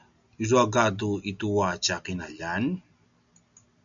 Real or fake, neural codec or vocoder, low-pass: real; none; 7.2 kHz